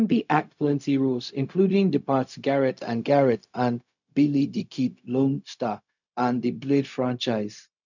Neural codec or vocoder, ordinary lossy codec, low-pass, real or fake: codec, 16 kHz, 0.4 kbps, LongCat-Audio-Codec; none; 7.2 kHz; fake